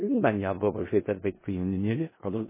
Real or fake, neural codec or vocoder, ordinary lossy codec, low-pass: fake; codec, 16 kHz in and 24 kHz out, 0.4 kbps, LongCat-Audio-Codec, four codebook decoder; MP3, 16 kbps; 3.6 kHz